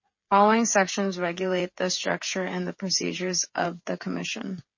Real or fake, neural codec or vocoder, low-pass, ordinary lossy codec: fake; codec, 16 kHz, 8 kbps, FreqCodec, smaller model; 7.2 kHz; MP3, 32 kbps